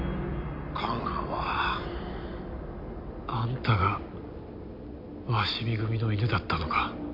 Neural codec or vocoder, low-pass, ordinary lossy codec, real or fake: none; 5.4 kHz; MP3, 48 kbps; real